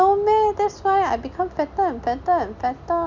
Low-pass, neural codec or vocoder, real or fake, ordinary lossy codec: 7.2 kHz; none; real; none